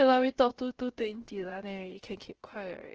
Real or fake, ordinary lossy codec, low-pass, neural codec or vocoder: fake; Opus, 16 kbps; 7.2 kHz; codec, 16 kHz, about 1 kbps, DyCAST, with the encoder's durations